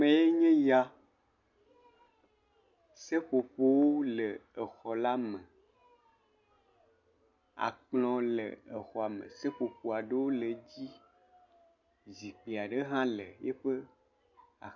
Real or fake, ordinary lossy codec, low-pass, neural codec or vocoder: real; MP3, 64 kbps; 7.2 kHz; none